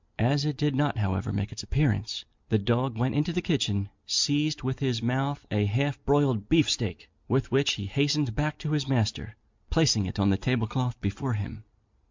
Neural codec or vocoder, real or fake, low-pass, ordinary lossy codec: none; real; 7.2 kHz; MP3, 64 kbps